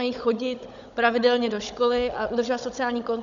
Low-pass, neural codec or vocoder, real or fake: 7.2 kHz; codec, 16 kHz, 16 kbps, FunCodec, trained on Chinese and English, 50 frames a second; fake